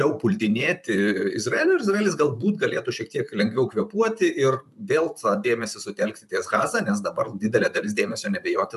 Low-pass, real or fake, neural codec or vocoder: 14.4 kHz; fake; vocoder, 44.1 kHz, 128 mel bands, Pupu-Vocoder